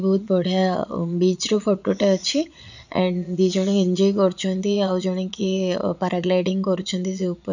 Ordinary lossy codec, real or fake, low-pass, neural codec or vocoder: none; fake; 7.2 kHz; vocoder, 22.05 kHz, 80 mel bands, Vocos